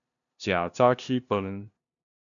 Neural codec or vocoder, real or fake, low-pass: codec, 16 kHz, 0.5 kbps, FunCodec, trained on LibriTTS, 25 frames a second; fake; 7.2 kHz